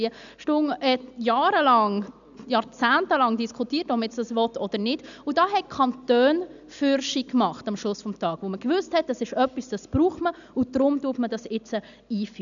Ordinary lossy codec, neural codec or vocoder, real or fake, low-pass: none; none; real; 7.2 kHz